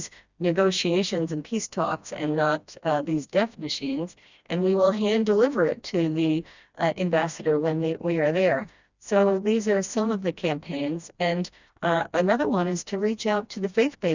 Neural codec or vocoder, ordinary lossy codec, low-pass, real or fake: codec, 16 kHz, 1 kbps, FreqCodec, smaller model; Opus, 64 kbps; 7.2 kHz; fake